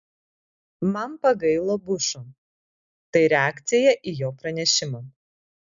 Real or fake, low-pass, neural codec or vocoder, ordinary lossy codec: real; 7.2 kHz; none; MP3, 96 kbps